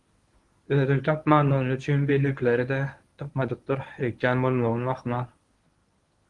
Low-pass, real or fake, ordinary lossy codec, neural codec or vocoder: 10.8 kHz; fake; Opus, 24 kbps; codec, 24 kHz, 0.9 kbps, WavTokenizer, medium speech release version 1